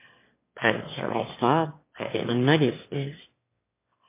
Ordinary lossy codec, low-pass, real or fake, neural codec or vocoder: MP3, 24 kbps; 3.6 kHz; fake; autoencoder, 22.05 kHz, a latent of 192 numbers a frame, VITS, trained on one speaker